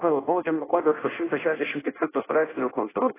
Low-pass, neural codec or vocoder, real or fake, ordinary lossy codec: 3.6 kHz; codec, 16 kHz in and 24 kHz out, 0.6 kbps, FireRedTTS-2 codec; fake; AAC, 16 kbps